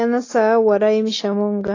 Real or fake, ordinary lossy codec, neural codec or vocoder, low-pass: real; AAC, 32 kbps; none; 7.2 kHz